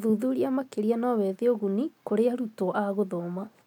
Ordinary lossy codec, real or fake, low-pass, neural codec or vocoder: none; real; 19.8 kHz; none